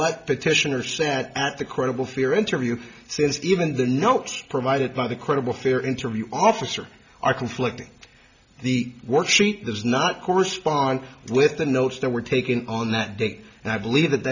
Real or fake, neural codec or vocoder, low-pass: real; none; 7.2 kHz